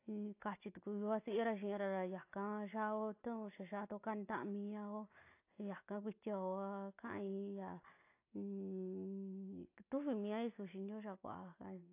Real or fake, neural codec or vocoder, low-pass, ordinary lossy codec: real; none; 3.6 kHz; AAC, 24 kbps